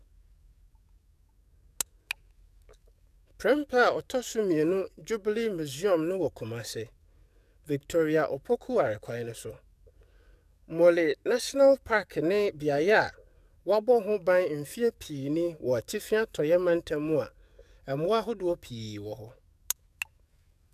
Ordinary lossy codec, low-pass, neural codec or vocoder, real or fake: none; 14.4 kHz; codec, 44.1 kHz, 7.8 kbps, DAC; fake